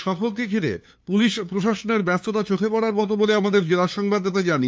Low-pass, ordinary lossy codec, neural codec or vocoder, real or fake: none; none; codec, 16 kHz, 2 kbps, FunCodec, trained on LibriTTS, 25 frames a second; fake